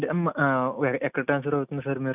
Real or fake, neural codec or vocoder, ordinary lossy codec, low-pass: real; none; none; 3.6 kHz